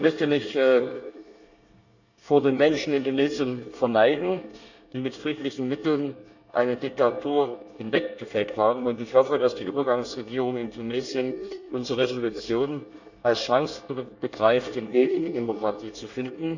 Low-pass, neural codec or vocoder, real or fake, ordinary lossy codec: 7.2 kHz; codec, 24 kHz, 1 kbps, SNAC; fake; none